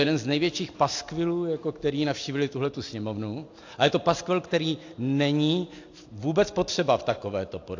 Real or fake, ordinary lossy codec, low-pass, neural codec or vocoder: real; AAC, 48 kbps; 7.2 kHz; none